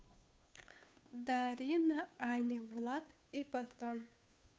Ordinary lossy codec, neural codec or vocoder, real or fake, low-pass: none; codec, 16 kHz, 2 kbps, FunCodec, trained on Chinese and English, 25 frames a second; fake; none